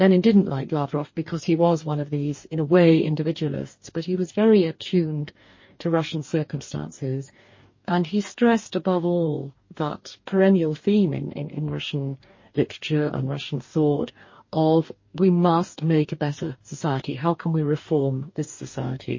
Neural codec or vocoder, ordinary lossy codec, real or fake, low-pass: codec, 44.1 kHz, 2.6 kbps, DAC; MP3, 32 kbps; fake; 7.2 kHz